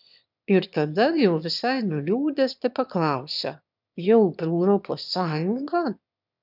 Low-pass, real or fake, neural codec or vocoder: 5.4 kHz; fake; autoencoder, 22.05 kHz, a latent of 192 numbers a frame, VITS, trained on one speaker